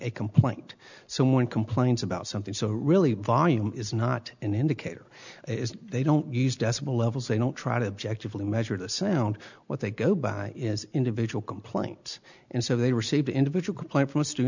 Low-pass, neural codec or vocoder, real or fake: 7.2 kHz; none; real